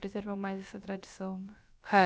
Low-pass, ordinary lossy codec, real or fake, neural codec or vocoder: none; none; fake; codec, 16 kHz, about 1 kbps, DyCAST, with the encoder's durations